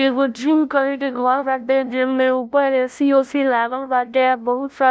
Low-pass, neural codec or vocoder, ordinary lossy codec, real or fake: none; codec, 16 kHz, 0.5 kbps, FunCodec, trained on LibriTTS, 25 frames a second; none; fake